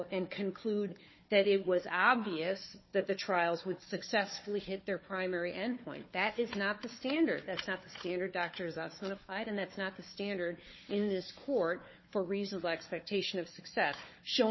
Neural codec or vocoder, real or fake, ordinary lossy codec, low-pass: codec, 16 kHz, 4 kbps, FunCodec, trained on Chinese and English, 50 frames a second; fake; MP3, 24 kbps; 7.2 kHz